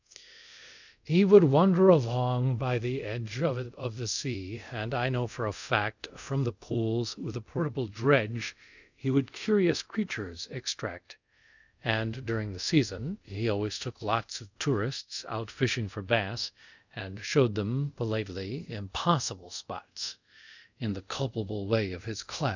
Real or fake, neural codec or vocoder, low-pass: fake; codec, 24 kHz, 0.5 kbps, DualCodec; 7.2 kHz